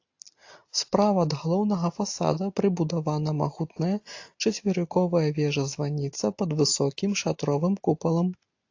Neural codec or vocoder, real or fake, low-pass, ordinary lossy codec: none; real; 7.2 kHz; AAC, 48 kbps